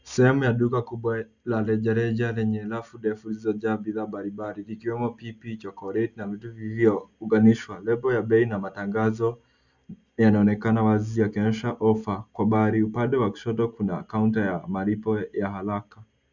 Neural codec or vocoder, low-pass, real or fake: none; 7.2 kHz; real